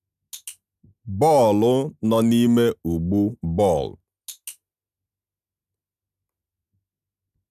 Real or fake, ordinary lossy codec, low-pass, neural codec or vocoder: real; none; 14.4 kHz; none